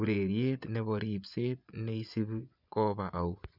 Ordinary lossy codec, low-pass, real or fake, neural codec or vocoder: none; 5.4 kHz; fake; vocoder, 24 kHz, 100 mel bands, Vocos